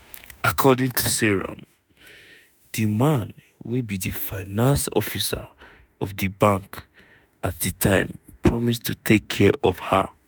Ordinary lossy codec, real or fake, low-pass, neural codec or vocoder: none; fake; none; autoencoder, 48 kHz, 32 numbers a frame, DAC-VAE, trained on Japanese speech